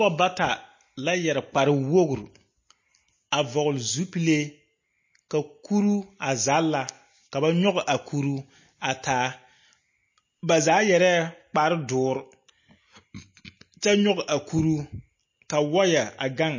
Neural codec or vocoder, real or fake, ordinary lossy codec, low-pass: none; real; MP3, 32 kbps; 7.2 kHz